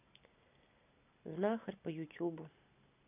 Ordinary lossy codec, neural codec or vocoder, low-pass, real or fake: none; none; 3.6 kHz; real